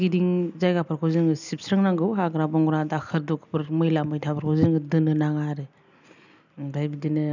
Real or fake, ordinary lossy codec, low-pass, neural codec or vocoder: real; none; 7.2 kHz; none